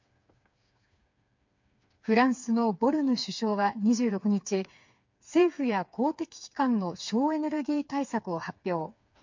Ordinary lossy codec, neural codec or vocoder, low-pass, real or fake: MP3, 48 kbps; codec, 16 kHz, 4 kbps, FreqCodec, smaller model; 7.2 kHz; fake